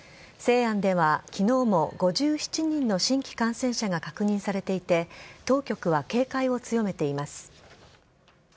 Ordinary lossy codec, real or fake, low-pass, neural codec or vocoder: none; real; none; none